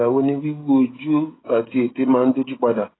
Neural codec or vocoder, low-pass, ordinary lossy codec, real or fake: codec, 16 kHz, 16 kbps, FreqCodec, smaller model; 7.2 kHz; AAC, 16 kbps; fake